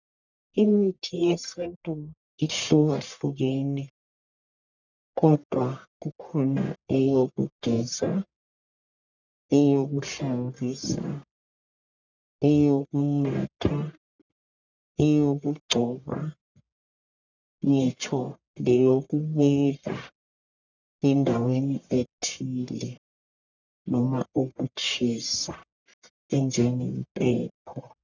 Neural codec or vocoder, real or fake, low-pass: codec, 44.1 kHz, 1.7 kbps, Pupu-Codec; fake; 7.2 kHz